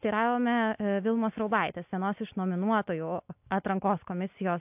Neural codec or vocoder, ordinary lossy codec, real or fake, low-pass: none; AAC, 32 kbps; real; 3.6 kHz